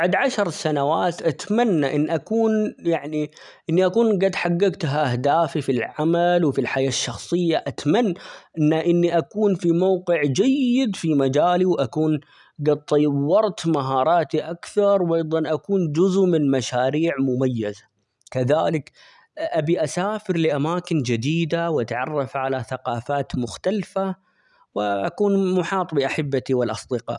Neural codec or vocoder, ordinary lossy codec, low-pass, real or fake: none; none; 10.8 kHz; real